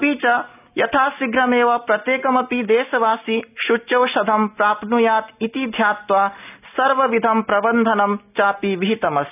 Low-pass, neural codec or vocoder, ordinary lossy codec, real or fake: 3.6 kHz; none; none; real